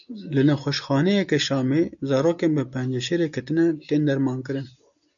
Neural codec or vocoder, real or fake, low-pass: none; real; 7.2 kHz